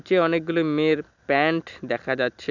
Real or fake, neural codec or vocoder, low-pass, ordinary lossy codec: real; none; 7.2 kHz; none